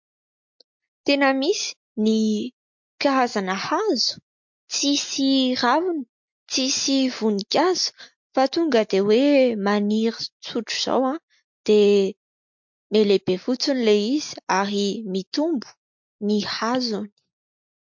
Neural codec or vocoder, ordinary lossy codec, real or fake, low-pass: none; MP3, 48 kbps; real; 7.2 kHz